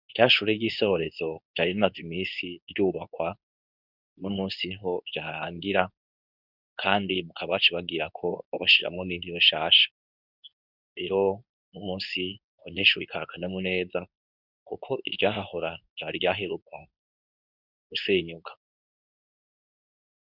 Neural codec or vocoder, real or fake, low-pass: codec, 24 kHz, 0.9 kbps, WavTokenizer, medium speech release version 2; fake; 5.4 kHz